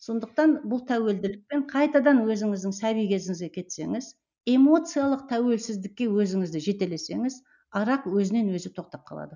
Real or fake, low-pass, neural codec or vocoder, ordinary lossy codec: real; 7.2 kHz; none; none